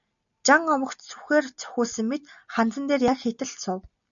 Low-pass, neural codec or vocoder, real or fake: 7.2 kHz; none; real